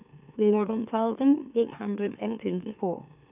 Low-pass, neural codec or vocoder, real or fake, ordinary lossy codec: 3.6 kHz; autoencoder, 44.1 kHz, a latent of 192 numbers a frame, MeloTTS; fake; none